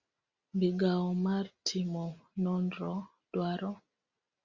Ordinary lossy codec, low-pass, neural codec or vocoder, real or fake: Opus, 64 kbps; 7.2 kHz; none; real